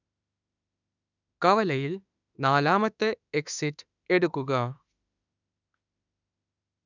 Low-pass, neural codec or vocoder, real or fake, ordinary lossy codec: 7.2 kHz; autoencoder, 48 kHz, 32 numbers a frame, DAC-VAE, trained on Japanese speech; fake; none